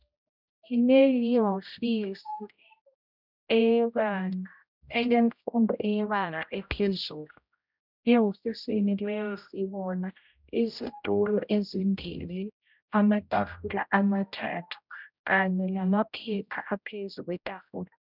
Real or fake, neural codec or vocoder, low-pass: fake; codec, 16 kHz, 0.5 kbps, X-Codec, HuBERT features, trained on general audio; 5.4 kHz